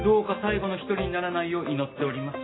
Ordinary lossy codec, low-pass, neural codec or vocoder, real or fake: AAC, 16 kbps; 7.2 kHz; none; real